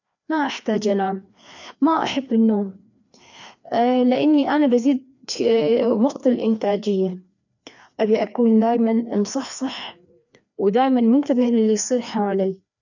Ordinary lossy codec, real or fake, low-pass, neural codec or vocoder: none; fake; 7.2 kHz; codec, 16 kHz, 2 kbps, FreqCodec, larger model